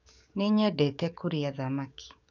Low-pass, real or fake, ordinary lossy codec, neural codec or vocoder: 7.2 kHz; fake; none; codec, 44.1 kHz, 7.8 kbps, DAC